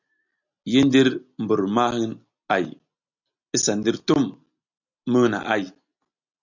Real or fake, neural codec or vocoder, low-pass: real; none; 7.2 kHz